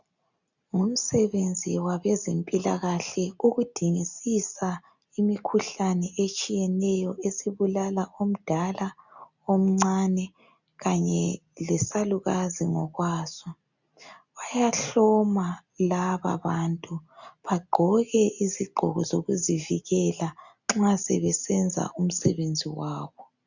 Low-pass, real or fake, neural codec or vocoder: 7.2 kHz; real; none